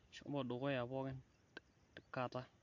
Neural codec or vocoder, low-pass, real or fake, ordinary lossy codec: none; 7.2 kHz; real; MP3, 48 kbps